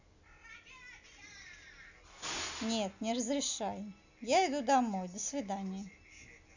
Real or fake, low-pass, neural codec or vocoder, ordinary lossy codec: real; 7.2 kHz; none; none